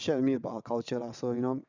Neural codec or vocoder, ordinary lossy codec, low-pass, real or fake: vocoder, 22.05 kHz, 80 mel bands, WaveNeXt; none; 7.2 kHz; fake